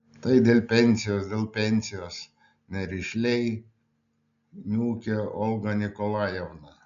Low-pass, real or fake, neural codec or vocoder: 7.2 kHz; real; none